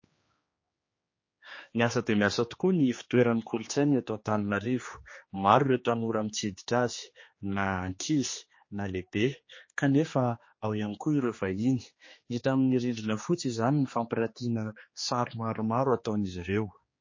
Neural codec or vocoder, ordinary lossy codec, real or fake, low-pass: codec, 16 kHz, 2 kbps, X-Codec, HuBERT features, trained on general audio; MP3, 32 kbps; fake; 7.2 kHz